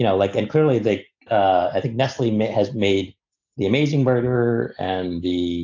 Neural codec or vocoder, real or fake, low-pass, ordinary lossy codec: none; real; 7.2 kHz; AAC, 48 kbps